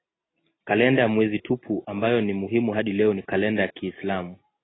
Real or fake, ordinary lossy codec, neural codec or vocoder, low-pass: real; AAC, 16 kbps; none; 7.2 kHz